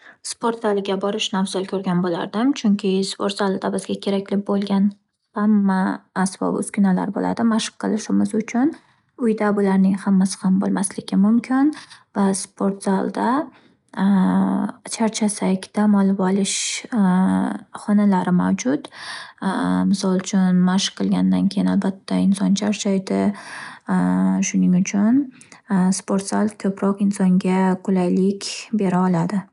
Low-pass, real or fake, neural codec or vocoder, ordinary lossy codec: 10.8 kHz; real; none; none